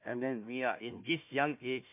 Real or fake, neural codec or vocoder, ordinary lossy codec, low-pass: fake; codec, 16 kHz, 1 kbps, FunCodec, trained on Chinese and English, 50 frames a second; MP3, 32 kbps; 3.6 kHz